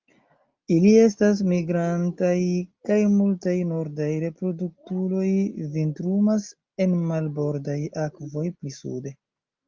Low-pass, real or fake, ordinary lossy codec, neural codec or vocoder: 7.2 kHz; real; Opus, 24 kbps; none